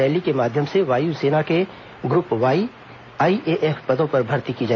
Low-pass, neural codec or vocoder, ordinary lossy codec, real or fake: 7.2 kHz; none; AAC, 32 kbps; real